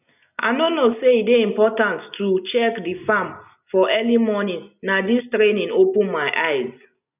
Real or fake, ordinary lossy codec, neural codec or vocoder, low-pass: real; none; none; 3.6 kHz